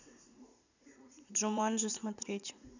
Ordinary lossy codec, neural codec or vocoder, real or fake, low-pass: none; vocoder, 44.1 kHz, 80 mel bands, Vocos; fake; 7.2 kHz